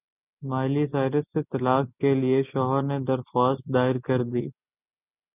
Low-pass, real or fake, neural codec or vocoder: 3.6 kHz; real; none